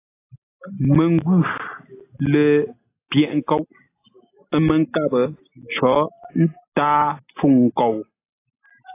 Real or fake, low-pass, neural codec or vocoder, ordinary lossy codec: real; 3.6 kHz; none; AAC, 24 kbps